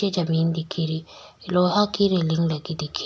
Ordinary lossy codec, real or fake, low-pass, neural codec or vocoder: none; real; none; none